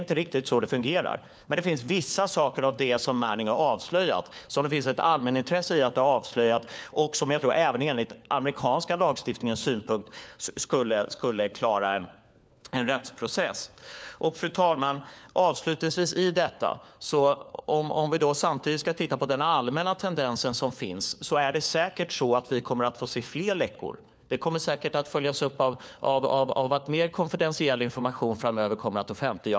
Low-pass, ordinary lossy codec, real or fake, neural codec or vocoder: none; none; fake; codec, 16 kHz, 4 kbps, FunCodec, trained on LibriTTS, 50 frames a second